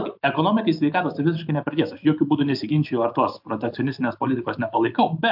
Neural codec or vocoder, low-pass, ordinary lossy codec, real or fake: none; 5.4 kHz; AAC, 48 kbps; real